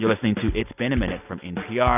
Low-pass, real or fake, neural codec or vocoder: 3.6 kHz; real; none